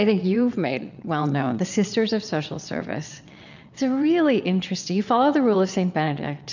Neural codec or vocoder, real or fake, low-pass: vocoder, 22.05 kHz, 80 mel bands, WaveNeXt; fake; 7.2 kHz